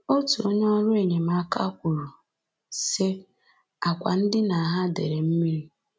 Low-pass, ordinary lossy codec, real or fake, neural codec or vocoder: none; none; real; none